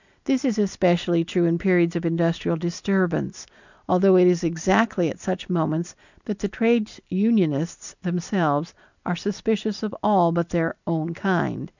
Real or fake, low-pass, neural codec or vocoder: real; 7.2 kHz; none